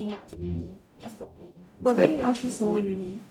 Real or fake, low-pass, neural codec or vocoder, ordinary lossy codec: fake; 19.8 kHz; codec, 44.1 kHz, 0.9 kbps, DAC; none